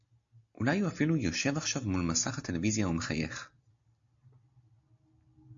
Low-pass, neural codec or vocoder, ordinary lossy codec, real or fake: 7.2 kHz; none; AAC, 64 kbps; real